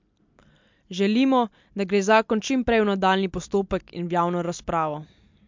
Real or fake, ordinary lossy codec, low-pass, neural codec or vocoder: real; MP3, 64 kbps; 7.2 kHz; none